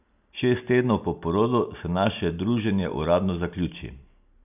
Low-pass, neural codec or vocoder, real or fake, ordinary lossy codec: 3.6 kHz; none; real; none